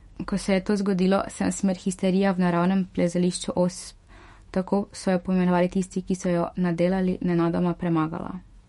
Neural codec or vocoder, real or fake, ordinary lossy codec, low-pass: autoencoder, 48 kHz, 128 numbers a frame, DAC-VAE, trained on Japanese speech; fake; MP3, 48 kbps; 19.8 kHz